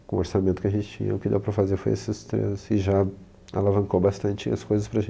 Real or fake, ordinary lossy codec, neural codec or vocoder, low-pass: real; none; none; none